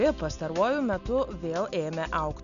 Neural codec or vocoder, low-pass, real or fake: none; 7.2 kHz; real